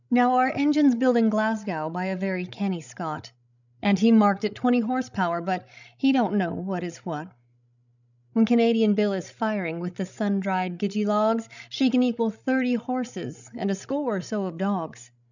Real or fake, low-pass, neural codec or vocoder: fake; 7.2 kHz; codec, 16 kHz, 16 kbps, FreqCodec, larger model